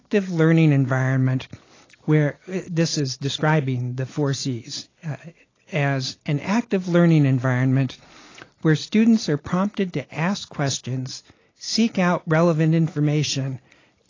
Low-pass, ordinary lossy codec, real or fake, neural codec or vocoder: 7.2 kHz; AAC, 32 kbps; real; none